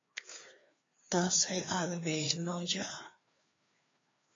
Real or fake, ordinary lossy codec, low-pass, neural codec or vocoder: fake; AAC, 32 kbps; 7.2 kHz; codec, 16 kHz, 2 kbps, FreqCodec, larger model